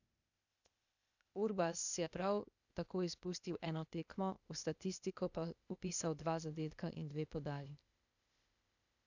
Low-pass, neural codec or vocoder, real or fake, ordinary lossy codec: 7.2 kHz; codec, 16 kHz, 0.8 kbps, ZipCodec; fake; none